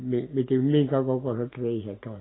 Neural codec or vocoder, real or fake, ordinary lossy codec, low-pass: none; real; AAC, 16 kbps; 7.2 kHz